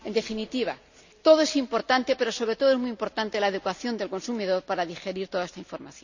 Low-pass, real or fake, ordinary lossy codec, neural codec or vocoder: 7.2 kHz; real; none; none